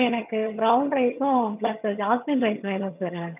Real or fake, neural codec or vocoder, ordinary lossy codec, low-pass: fake; vocoder, 22.05 kHz, 80 mel bands, HiFi-GAN; none; 3.6 kHz